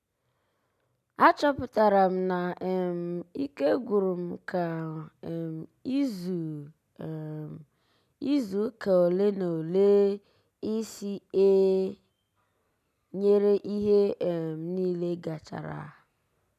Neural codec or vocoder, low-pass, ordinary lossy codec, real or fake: none; 14.4 kHz; none; real